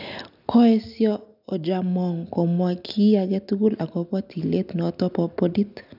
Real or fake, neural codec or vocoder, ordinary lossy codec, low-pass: real; none; none; 5.4 kHz